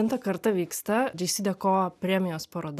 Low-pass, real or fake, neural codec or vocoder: 14.4 kHz; real; none